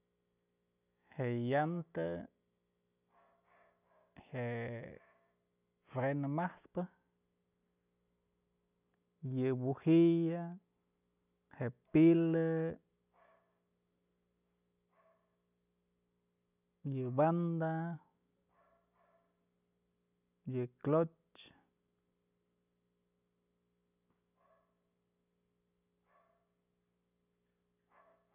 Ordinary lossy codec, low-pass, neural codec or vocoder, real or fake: none; 3.6 kHz; none; real